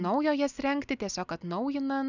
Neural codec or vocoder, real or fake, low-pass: none; real; 7.2 kHz